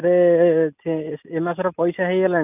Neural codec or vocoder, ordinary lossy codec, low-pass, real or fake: none; none; 3.6 kHz; real